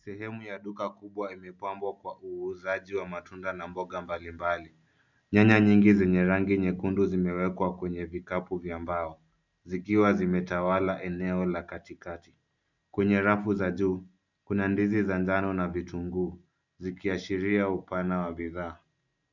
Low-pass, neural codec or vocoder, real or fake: 7.2 kHz; none; real